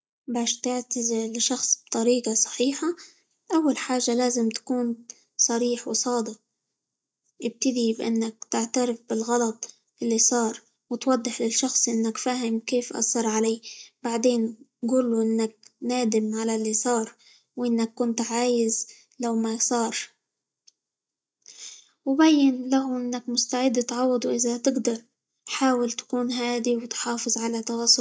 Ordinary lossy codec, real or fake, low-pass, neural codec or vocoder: none; real; none; none